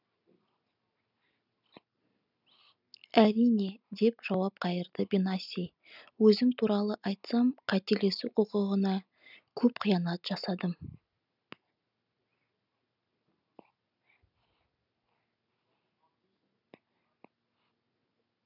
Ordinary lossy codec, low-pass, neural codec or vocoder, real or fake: none; 5.4 kHz; none; real